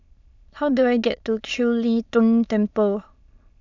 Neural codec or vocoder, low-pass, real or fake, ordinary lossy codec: autoencoder, 22.05 kHz, a latent of 192 numbers a frame, VITS, trained on many speakers; 7.2 kHz; fake; none